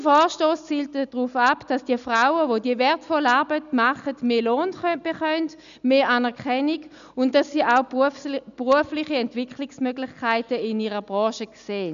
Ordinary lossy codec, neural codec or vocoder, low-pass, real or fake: none; none; 7.2 kHz; real